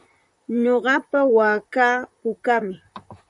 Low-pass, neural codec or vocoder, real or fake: 10.8 kHz; vocoder, 44.1 kHz, 128 mel bands, Pupu-Vocoder; fake